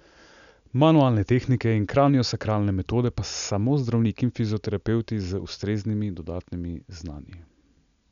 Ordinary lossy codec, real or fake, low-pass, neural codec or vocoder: none; real; 7.2 kHz; none